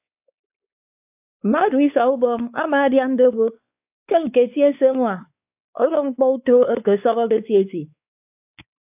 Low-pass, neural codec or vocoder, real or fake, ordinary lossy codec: 3.6 kHz; codec, 16 kHz, 4 kbps, X-Codec, WavLM features, trained on Multilingual LibriSpeech; fake; AAC, 32 kbps